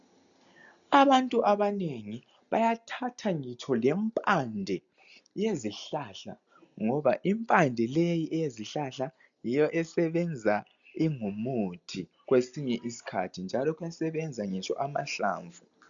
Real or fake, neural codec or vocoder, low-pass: real; none; 7.2 kHz